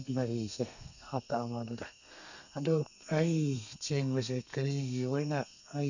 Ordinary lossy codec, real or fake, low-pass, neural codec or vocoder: none; fake; 7.2 kHz; codec, 32 kHz, 1.9 kbps, SNAC